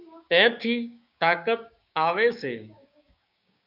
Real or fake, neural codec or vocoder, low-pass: fake; codec, 16 kHz, 6 kbps, DAC; 5.4 kHz